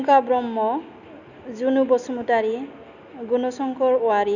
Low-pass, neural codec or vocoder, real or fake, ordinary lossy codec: 7.2 kHz; none; real; none